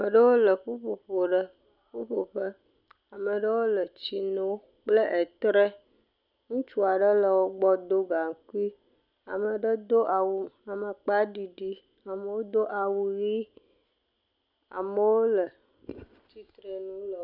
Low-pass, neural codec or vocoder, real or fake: 5.4 kHz; none; real